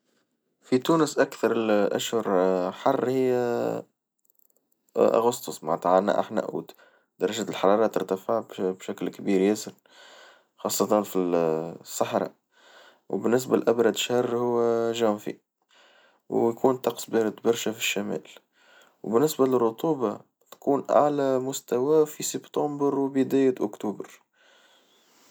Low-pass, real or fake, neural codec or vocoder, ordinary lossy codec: none; real; none; none